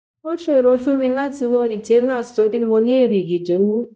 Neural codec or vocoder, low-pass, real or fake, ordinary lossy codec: codec, 16 kHz, 0.5 kbps, X-Codec, HuBERT features, trained on balanced general audio; none; fake; none